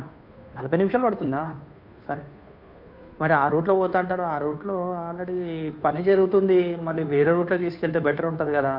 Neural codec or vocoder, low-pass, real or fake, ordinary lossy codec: codec, 16 kHz, 2 kbps, FunCodec, trained on Chinese and English, 25 frames a second; 5.4 kHz; fake; none